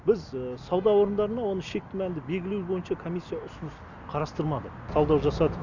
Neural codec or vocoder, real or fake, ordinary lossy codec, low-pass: none; real; Opus, 64 kbps; 7.2 kHz